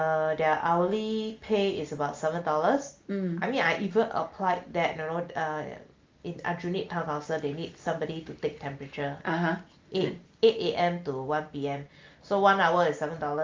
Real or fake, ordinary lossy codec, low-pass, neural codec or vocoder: real; Opus, 32 kbps; 7.2 kHz; none